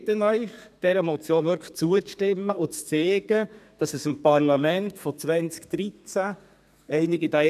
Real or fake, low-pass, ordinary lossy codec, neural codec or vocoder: fake; 14.4 kHz; none; codec, 32 kHz, 1.9 kbps, SNAC